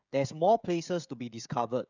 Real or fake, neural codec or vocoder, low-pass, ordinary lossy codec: fake; codec, 16 kHz, 16 kbps, FreqCodec, smaller model; 7.2 kHz; none